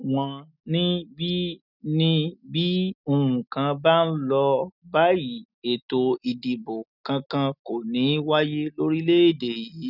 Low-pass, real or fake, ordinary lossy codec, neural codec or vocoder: 5.4 kHz; real; none; none